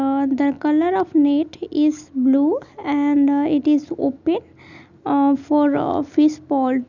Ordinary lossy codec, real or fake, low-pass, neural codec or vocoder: none; real; 7.2 kHz; none